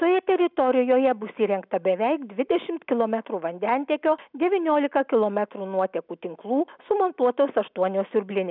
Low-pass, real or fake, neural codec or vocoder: 5.4 kHz; real; none